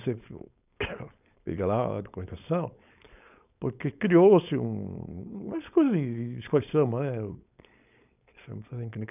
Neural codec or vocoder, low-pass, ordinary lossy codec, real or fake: codec, 16 kHz, 4.8 kbps, FACodec; 3.6 kHz; none; fake